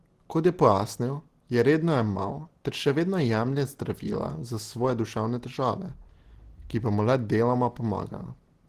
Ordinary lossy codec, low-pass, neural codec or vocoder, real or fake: Opus, 16 kbps; 14.4 kHz; none; real